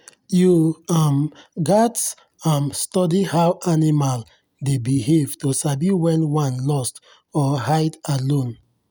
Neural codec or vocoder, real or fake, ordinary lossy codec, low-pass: none; real; none; none